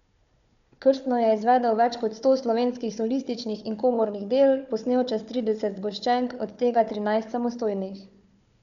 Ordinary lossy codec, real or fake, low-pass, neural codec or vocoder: none; fake; 7.2 kHz; codec, 16 kHz, 4 kbps, FunCodec, trained on Chinese and English, 50 frames a second